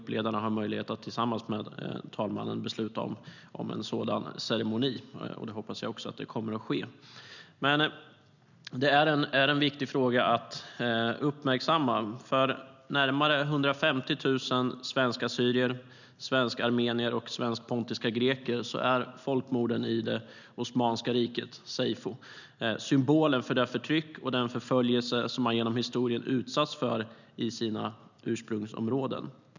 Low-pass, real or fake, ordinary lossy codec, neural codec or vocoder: 7.2 kHz; real; none; none